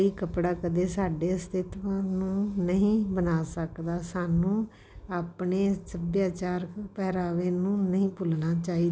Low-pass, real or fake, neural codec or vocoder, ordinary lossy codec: none; real; none; none